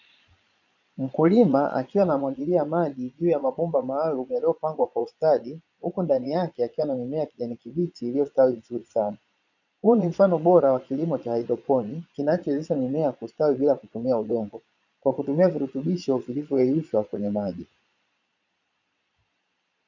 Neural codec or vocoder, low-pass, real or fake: vocoder, 22.05 kHz, 80 mel bands, Vocos; 7.2 kHz; fake